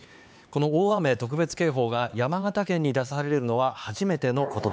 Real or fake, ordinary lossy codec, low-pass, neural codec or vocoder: fake; none; none; codec, 16 kHz, 4 kbps, X-Codec, HuBERT features, trained on LibriSpeech